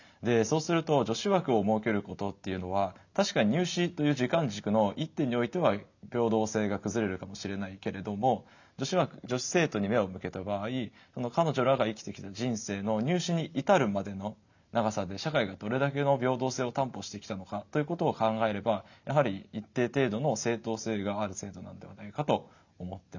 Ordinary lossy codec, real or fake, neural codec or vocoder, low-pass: none; real; none; 7.2 kHz